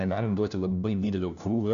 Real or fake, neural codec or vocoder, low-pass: fake; codec, 16 kHz, 1 kbps, FunCodec, trained on LibriTTS, 50 frames a second; 7.2 kHz